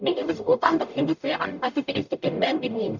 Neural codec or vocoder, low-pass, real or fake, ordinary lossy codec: codec, 44.1 kHz, 0.9 kbps, DAC; 7.2 kHz; fake; none